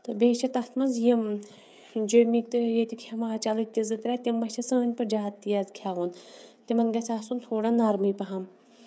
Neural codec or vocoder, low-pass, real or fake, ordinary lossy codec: codec, 16 kHz, 16 kbps, FreqCodec, smaller model; none; fake; none